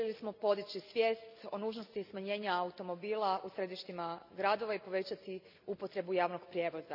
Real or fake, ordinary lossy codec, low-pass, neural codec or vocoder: real; none; 5.4 kHz; none